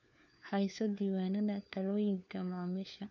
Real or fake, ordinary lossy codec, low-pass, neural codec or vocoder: fake; none; 7.2 kHz; codec, 16 kHz, 4 kbps, FunCodec, trained on LibriTTS, 50 frames a second